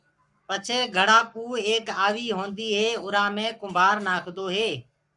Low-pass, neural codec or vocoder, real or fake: 10.8 kHz; codec, 44.1 kHz, 7.8 kbps, Pupu-Codec; fake